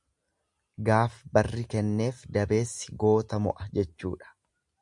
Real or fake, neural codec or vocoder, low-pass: real; none; 10.8 kHz